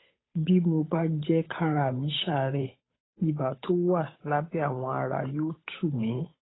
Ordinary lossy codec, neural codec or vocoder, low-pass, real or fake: AAC, 16 kbps; codec, 16 kHz, 8 kbps, FunCodec, trained on Chinese and English, 25 frames a second; 7.2 kHz; fake